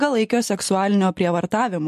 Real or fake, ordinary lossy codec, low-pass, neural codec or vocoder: real; MP3, 64 kbps; 14.4 kHz; none